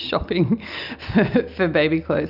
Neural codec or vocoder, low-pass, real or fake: none; 5.4 kHz; real